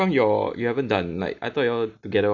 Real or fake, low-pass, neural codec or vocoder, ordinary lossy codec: real; 7.2 kHz; none; none